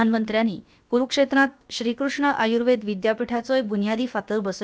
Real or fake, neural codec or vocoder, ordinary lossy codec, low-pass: fake; codec, 16 kHz, about 1 kbps, DyCAST, with the encoder's durations; none; none